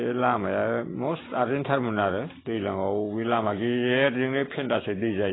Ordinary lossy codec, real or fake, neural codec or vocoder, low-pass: AAC, 16 kbps; real; none; 7.2 kHz